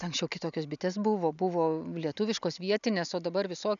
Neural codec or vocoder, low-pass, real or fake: none; 7.2 kHz; real